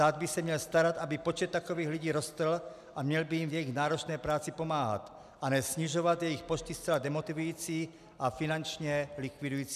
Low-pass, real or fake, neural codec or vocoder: 14.4 kHz; real; none